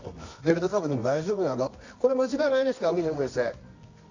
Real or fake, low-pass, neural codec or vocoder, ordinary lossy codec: fake; 7.2 kHz; codec, 24 kHz, 0.9 kbps, WavTokenizer, medium music audio release; AAC, 48 kbps